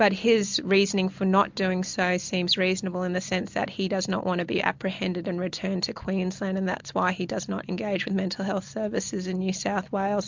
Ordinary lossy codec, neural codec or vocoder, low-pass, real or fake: MP3, 64 kbps; none; 7.2 kHz; real